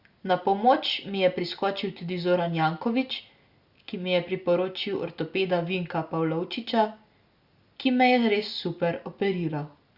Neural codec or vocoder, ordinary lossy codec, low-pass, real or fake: none; Opus, 64 kbps; 5.4 kHz; real